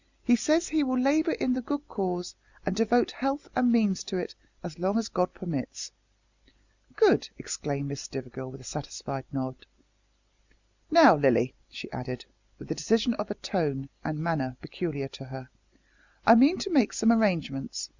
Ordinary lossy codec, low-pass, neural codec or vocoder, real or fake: Opus, 64 kbps; 7.2 kHz; none; real